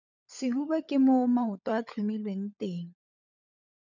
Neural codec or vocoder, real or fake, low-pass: codec, 16 kHz, 16 kbps, FunCodec, trained on LibriTTS, 50 frames a second; fake; 7.2 kHz